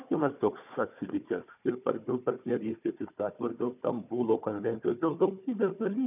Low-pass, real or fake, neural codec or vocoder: 3.6 kHz; fake; codec, 16 kHz, 4 kbps, FunCodec, trained on Chinese and English, 50 frames a second